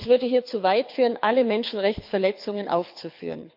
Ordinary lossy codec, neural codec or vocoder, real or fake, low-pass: MP3, 48 kbps; codec, 24 kHz, 6 kbps, HILCodec; fake; 5.4 kHz